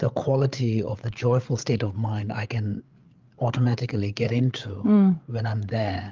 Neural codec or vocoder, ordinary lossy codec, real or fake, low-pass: codec, 16 kHz, 8 kbps, FreqCodec, larger model; Opus, 32 kbps; fake; 7.2 kHz